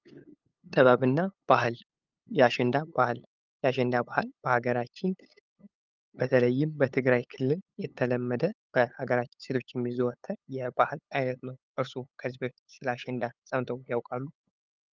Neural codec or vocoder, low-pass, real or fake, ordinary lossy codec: codec, 16 kHz, 8 kbps, FunCodec, trained on LibriTTS, 25 frames a second; 7.2 kHz; fake; Opus, 24 kbps